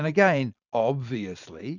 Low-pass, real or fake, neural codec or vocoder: 7.2 kHz; fake; vocoder, 44.1 kHz, 128 mel bands every 512 samples, BigVGAN v2